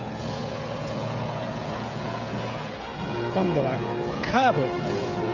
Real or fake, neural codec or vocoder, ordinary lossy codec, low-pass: fake; codec, 16 kHz, 16 kbps, FreqCodec, smaller model; Opus, 64 kbps; 7.2 kHz